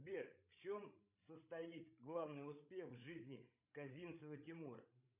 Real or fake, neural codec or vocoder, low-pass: fake; codec, 16 kHz, 16 kbps, FreqCodec, larger model; 3.6 kHz